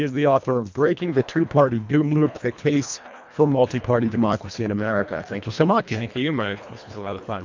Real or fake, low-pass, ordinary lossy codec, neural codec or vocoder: fake; 7.2 kHz; MP3, 64 kbps; codec, 24 kHz, 1.5 kbps, HILCodec